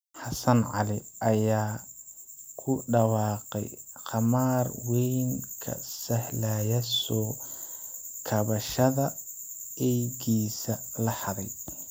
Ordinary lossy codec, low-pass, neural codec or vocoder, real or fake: none; none; none; real